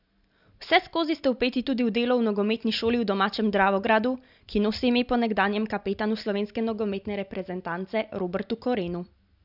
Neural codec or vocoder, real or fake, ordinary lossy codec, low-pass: none; real; none; 5.4 kHz